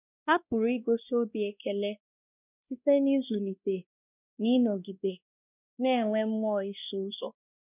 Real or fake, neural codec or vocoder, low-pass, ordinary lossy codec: fake; codec, 16 kHz, 1 kbps, X-Codec, WavLM features, trained on Multilingual LibriSpeech; 3.6 kHz; none